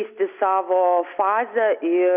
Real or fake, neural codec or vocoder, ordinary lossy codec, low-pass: real; none; AAC, 32 kbps; 3.6 kHz